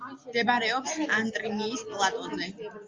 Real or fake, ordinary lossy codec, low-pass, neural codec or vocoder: real; Opus, 32 kbps; 7.2 kHz; none